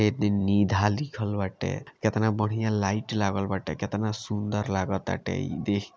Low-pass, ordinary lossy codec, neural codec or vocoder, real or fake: none; none; none; real